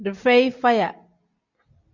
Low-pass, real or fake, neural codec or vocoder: 7.2 kHz; real; none